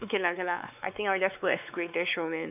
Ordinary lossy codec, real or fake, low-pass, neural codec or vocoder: none; fake; 3.6 kHz; codec, 16 kHz, 4 kbps, X-Codec, WavLM features, trained on Multilingual LibriSpeech